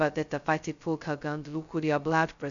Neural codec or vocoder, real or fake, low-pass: codec, 16 kHz, 0.2 kbps, FocalCodec; fake; 7.2 kHz